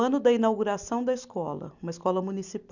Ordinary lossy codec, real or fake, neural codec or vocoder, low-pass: none; real; none; 7.2 kHz